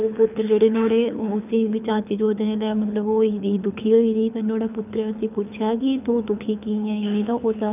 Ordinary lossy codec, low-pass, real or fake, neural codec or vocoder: none; 3.6 kHz; fake; codec, 24 kHz, 6 kbps, HILCodec